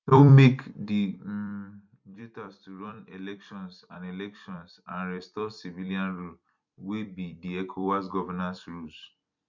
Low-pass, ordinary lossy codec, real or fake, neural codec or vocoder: 7.2 kHz; none; fake; vocoder, 44.1 kHz, 128 mel bands every 256 samples, BigVGAN v2